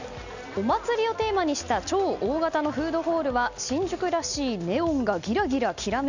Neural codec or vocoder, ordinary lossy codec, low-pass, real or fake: none; none; 7.2 kHz; real